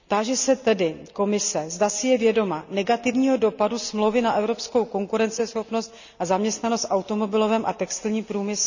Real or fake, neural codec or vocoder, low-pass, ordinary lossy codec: real; none; 7.2 kHz; none